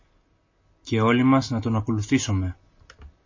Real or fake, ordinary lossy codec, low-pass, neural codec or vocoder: real; MP3, 32 kbps; 7.2 kHz; none